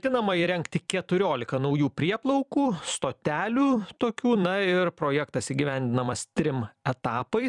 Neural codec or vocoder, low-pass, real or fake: vocoder, 44.1 kHz, 128 mel bands every 256 samples, BigVGAN v2; 10.8 kHz; fake